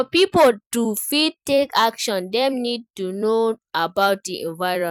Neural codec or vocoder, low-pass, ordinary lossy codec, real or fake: vocoder, 44.1 kHz, 128 mel bands every 256 samples, BigVGAN v2; 19.8 kHz; none; fake